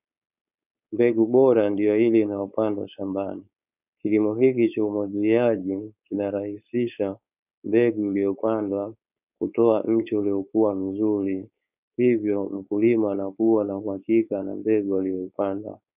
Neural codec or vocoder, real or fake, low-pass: codec, 16 kHz, 4.8 kbps, FACodec; fake; 3.6 kHz